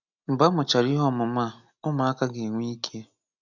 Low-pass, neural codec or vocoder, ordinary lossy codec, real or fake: 7.2 kHz; none; none; real